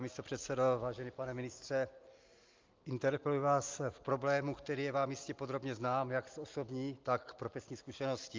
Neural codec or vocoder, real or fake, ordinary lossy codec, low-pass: none; real; Opus, 32 kbps; 7.2 kHz